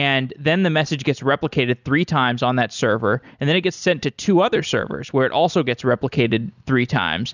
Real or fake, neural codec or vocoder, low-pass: real; none; 7.2 kHz